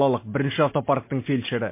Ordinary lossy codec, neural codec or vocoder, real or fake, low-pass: MP3, 24 kbps; none; real; 3.6 kHz